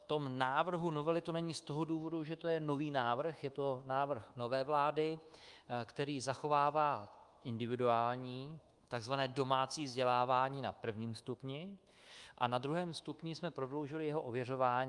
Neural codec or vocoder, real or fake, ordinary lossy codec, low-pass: codec, 24 kHz, 1.2 kbps, DualCodec; fake; Opus, 32 kbps; 10.8 kHz